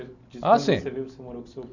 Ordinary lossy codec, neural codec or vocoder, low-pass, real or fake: none; none; 7.2 kHz; real